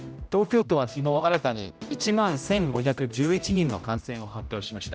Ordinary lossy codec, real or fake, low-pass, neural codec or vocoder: none; fake; none; codec, 16 kHz, 0.5 kbps, X-Codec, HuBERT features, trained on general audio